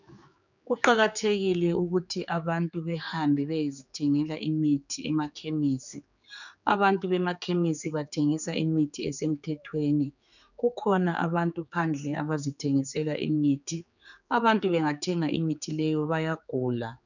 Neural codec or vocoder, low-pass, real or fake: codec, 16 kHz, 4 kbps, X-Codec, HuBERT features, trained on general audio; 7.2 kHz; fake